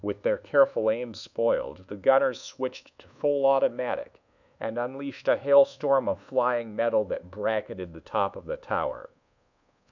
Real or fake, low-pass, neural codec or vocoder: fake; 7.2 kHz; codec, 24 kHz, 1.2 kbps, DualCodec